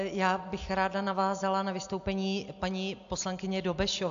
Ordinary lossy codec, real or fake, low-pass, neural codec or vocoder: AAC, 64 kbps; real; 7.2 kHz; none